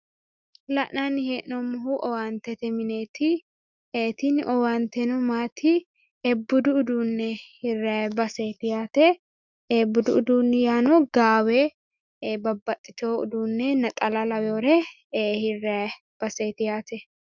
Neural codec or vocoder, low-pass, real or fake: autoencoder, 48 kHz, 128 numbers a frame, DAC-VAE, trained on Japanese speech; 7.2 kHz; fake